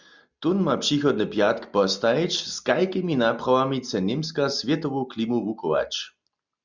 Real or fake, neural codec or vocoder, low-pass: real; none; 7.2 kHz